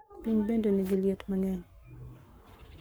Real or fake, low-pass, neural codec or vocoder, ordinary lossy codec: fake; none; codec, 44.1 kHz, 7.8 kbps, Pupu-Codec; none